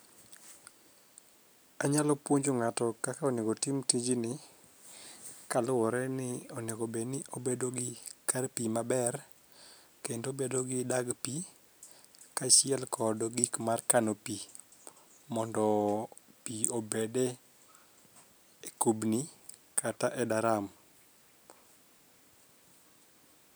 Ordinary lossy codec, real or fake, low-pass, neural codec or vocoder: none; real; none; none